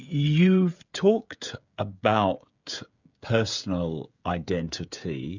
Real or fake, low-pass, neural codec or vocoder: fake; 7.2 kHz; codec, 16 kHz, 16 kbps, FreqCodec, smaller model